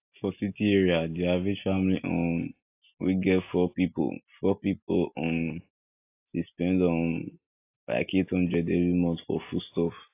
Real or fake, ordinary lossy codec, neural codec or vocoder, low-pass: real; AAC, 24 kbps; none; 3.6 kHz